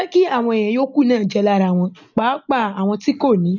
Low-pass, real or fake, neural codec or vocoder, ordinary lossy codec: 7.2 kHz; real; none; none